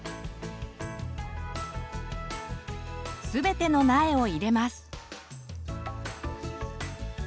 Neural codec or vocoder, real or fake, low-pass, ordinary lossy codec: none; real; none; none